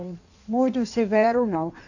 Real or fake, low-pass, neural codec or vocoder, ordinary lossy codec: fake; 7.2 kHz; codec, 16 kHz in and 24 kHz out, 1.1 kbps, FireRedTTS-2 codec; none